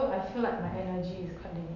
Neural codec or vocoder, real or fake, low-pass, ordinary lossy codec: none; real; 7.2 kHz; none